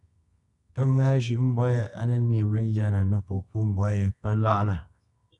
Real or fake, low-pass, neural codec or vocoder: fake; 10.8 kHz; codec, 24 kHz, 0.9 kbps, WavTokenizer, medium music audio release